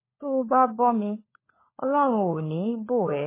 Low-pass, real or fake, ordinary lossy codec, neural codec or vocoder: 3.6 kHz; fake; MP3, 16 kbps; codec, 16 kHz, 4 kbps, FunCodec, trained on LibriTTS, 50 frames a second